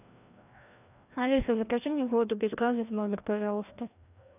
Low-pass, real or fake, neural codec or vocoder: 3.6 kHz; fake; codec, 16 kHz, 1 kbps, FreqCodec, larger model